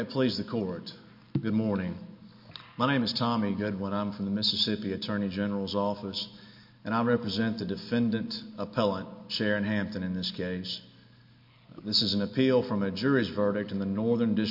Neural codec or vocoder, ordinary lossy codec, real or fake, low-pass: none; MP3, 32 kbps; real; 5.4 kHz